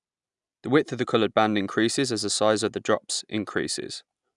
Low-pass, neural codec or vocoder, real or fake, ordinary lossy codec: 10.8 kHz; none; real; none